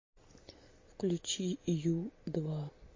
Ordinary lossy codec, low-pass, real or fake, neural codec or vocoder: MP3, 32 kbps; 7.2 kHz; real; none